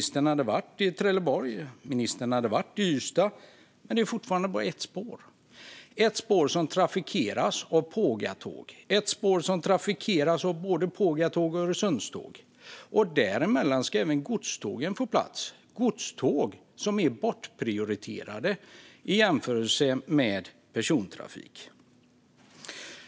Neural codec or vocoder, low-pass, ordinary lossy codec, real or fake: none; none; none; real